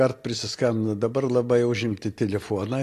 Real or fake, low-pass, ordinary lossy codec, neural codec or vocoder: real; 14.4 kHz; AAC, 48 kbps; none